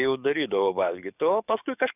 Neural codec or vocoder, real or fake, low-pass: codec, 44.1 kHz, 7.8 kbps, DAC; fake; 3.6 kHz